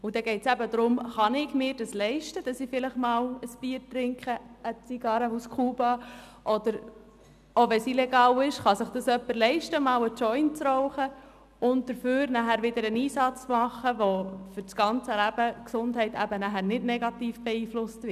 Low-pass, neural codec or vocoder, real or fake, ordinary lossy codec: 14.4 kHz; none; real; none